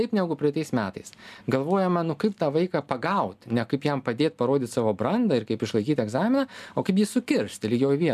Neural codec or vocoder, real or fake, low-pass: none; real; 14.4 kHz